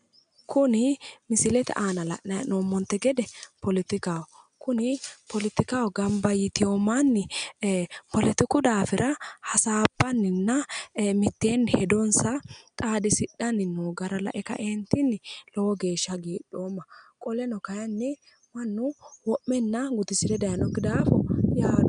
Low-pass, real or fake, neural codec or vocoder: 9.9 kHz; real; none